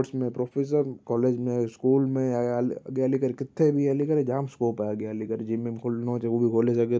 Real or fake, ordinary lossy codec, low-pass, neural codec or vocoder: real; none; none; none